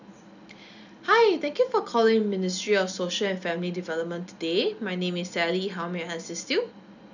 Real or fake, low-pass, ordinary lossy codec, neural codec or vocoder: real; 7.2 kHz; none; none